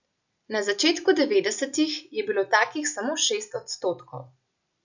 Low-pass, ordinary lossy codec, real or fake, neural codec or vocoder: 7.2 kHz; none; real; none